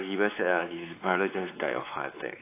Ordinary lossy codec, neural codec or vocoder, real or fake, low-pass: AAC, 24 kbps; codec, 16 kHz, 4 kbps, X-Codec, WavLM features, trained on Multilingual LibriSpeech; fake; 3.6 kHz